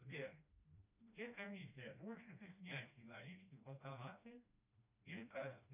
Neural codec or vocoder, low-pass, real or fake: codec, 16 kHz, 1 kbps, FreqCodec, smaller model; 3.6 kHz; fake